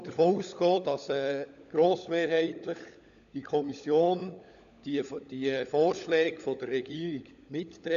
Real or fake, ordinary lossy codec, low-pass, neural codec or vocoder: fake; none; 7.2 kHz; codec, 16 kHz, 16 kbps, FunCodec, trained on LibriTTS, 50 frames a second